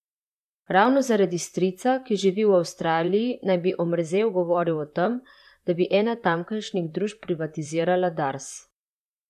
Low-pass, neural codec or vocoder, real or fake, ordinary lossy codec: 14.4 kHz; vocoder, 44.1 kHz, 128 mel bands, Pupu-Vocoder; fake; none